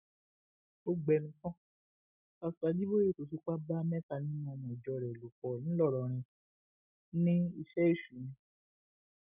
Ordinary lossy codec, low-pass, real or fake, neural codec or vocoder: none; 3.6 kHz; real; none